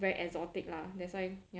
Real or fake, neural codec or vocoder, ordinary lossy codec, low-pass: real; none; none; none